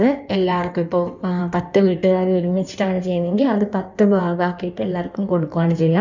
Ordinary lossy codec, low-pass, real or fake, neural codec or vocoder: none; 7.2 kHz; fake; codec, 16 kHz in and 24 kHz out, 1.1 kbps, FireRedTTS-2 codec